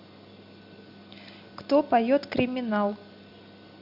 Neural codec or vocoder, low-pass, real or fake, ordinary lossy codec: none; 5.4 kHz; real; Opus, 64 kbps